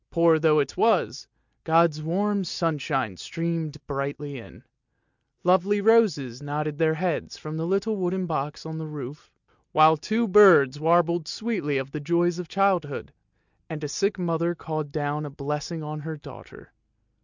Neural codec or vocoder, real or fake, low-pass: none; real; 7.2 kHz